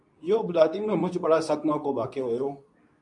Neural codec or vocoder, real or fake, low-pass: codec, 24 kHz, 0.9 kbps, WavTokenizer, medium speech release version 2; fake; 10.8 kHz